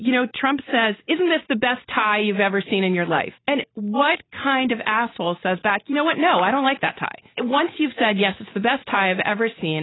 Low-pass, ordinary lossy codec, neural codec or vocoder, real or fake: 7.2 kHz; AAC, 16 kbps; none; real